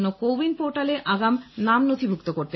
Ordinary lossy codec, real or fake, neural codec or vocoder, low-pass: MP3, 24 kbps; real; none; 7.2 kHz